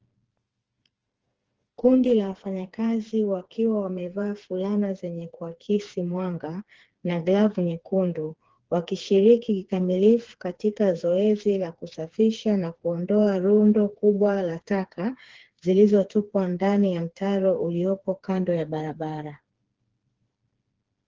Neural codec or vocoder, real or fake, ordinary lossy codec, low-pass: codec, 16 kHz, 4 kbps, FreqCodec, smaller model; fake; Opus, 16 kbps; 7.2 kHz